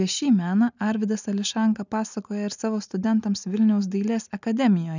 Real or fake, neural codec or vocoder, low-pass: real; none; 7.2 kHz